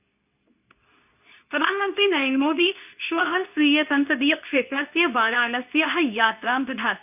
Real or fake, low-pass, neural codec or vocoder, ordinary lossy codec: fake; 3.6 kHz; codec, 24 kHz, 0.9 kbps, WavTokenizer, medium speech release version 2; none